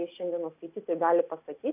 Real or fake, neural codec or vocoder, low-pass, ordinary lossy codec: real; none; 3.6 kHz; AAC, 32 kbps